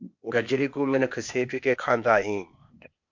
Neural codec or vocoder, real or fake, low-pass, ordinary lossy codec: codec, 16 kHz, 0.8 kbps, ZipCodec; fake; 7.2 kHz; MP3, 64 kbps